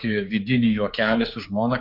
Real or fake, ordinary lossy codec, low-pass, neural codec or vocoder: fake; MP3, 48 kbps; 5.4 kHz; codec, 16 kHz, 4 kbps, X-Codec, HuBERT features, trained on general audio